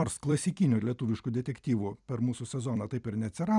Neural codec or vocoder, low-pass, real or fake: vocoder, 44.1 kHz, 128 mel bands every 256 samples, BigVGAN v2; 10.8 kHz; fake